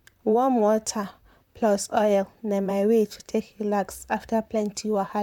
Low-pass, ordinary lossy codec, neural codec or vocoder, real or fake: 19.8 kHz; none; vocoder, 44.1 kHz, 128 mel bands, Pupu-Vocoder; fake